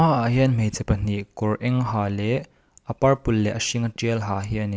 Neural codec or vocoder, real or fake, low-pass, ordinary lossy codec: none; real; none; none